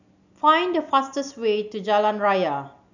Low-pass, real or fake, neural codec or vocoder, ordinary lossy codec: 7.2 kHz; real; none; none